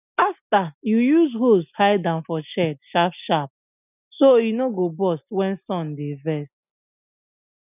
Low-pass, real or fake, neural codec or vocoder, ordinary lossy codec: 3.6 kHz; real; none; none